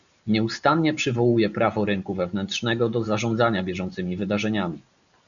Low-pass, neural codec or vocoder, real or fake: 7.2 kHz; none; real